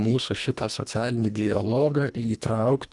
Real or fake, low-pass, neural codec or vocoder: fake; 10.8 kHz; codec, 24 kHz, 1.5 kbps, HILCodec